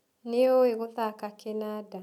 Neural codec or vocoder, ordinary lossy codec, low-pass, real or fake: none; none; 19.8 kHz; real